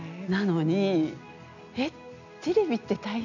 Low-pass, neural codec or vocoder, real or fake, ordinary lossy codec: 7.2 kHz; none; real; none